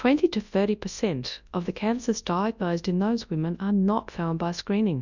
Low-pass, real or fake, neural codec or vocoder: 7.2 kHz; fake; codec, 24 kHz, 0.9 kbps, WavTokenizer, large speech release